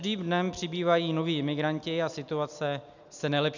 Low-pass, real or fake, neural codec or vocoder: 7.2 kHz; real; none